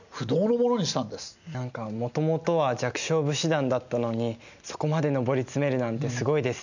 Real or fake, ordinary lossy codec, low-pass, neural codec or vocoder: real; none; 7.2 kHz; none